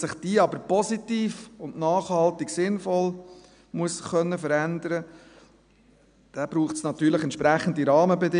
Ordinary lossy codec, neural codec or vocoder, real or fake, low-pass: none; none; real; 9.9 kHz